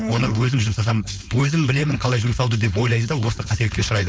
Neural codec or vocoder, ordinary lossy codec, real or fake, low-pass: codec, 16 kHz, 4.8 kbps, FACodec; none; fake; none